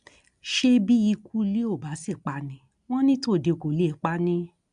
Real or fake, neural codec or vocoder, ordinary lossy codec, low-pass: real; none; MP3, 96 kbps; 9.9 kHz